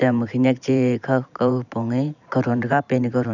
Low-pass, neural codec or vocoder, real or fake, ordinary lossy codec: 7.2 kHz; vocoder, 44.1 kHz, 128 mel bands every 512 samples, BigVGAN v2; fake; none